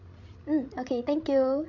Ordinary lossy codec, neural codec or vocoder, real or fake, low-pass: none; codec, 16 kHz, 16 kbps, FreqCodec, larger model; fake; 7.2 kHz